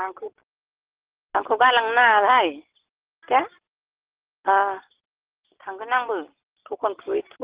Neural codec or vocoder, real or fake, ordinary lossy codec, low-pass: none; real; Opus, 16 kbps; 3.6 kHz